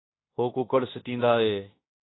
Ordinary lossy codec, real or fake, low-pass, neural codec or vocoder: AAC, 16 kbps; fake; 7.2 kHz; codec, 16 kHz in and 24 kHz out, 0.9 kbps, LongCat-Audio-Codec, fine tuned four codebook decoder